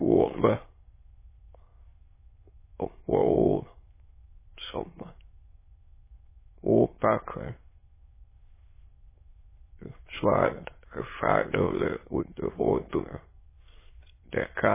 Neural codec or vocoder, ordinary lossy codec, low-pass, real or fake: autoencoder, 22.05 kHz, a latent of 192 numbers a frame, VITS, trained on many speakers; MP3, 16 kbps; 3.6 kHz; fake